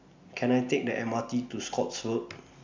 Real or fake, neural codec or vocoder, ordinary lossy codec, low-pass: real; none; MP3, 64 kbps; 7.2 kHz